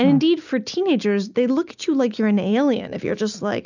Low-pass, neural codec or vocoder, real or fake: 7.2 kHz; none; real